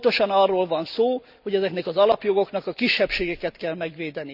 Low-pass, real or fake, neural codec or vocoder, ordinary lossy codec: 5.4 kHz; real; none; none